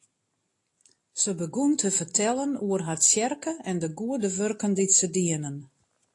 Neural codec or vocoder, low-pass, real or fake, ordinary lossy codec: none; 10.8 kHz; real; AAC, 48 kbps